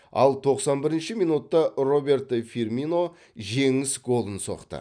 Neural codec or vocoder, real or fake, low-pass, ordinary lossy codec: none; real; 9.9 kHz; none